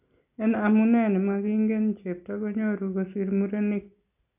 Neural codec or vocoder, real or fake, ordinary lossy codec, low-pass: none; real; AAC, 32 kbps; 3.6 kHz